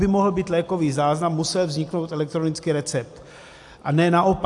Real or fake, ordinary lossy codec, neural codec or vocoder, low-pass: real; AAC, 64 kbps; none; 10.8 kHz